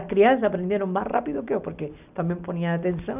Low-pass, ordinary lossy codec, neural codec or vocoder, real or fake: 3.6 kHz; none; none; real